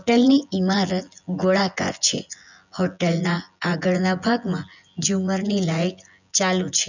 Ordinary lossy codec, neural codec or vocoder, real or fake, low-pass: none; vocoder, 24 kHz, 100 mel bands, Vocos; fake; 7.2 kHz